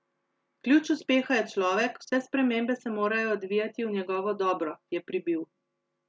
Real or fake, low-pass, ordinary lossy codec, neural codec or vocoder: real; none; none; none